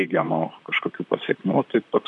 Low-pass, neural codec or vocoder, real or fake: 10.8 kHz; vocoder, 44.1 kHz, 128 mel bands, Pupu-Vocoder; fake